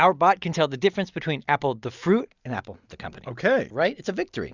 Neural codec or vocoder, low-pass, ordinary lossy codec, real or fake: none; 7.2 kHz; Opus, 64 kbps; real